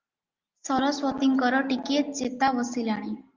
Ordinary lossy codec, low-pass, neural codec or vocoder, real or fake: Opus, 24 kbps; 7.2 kHz; none; real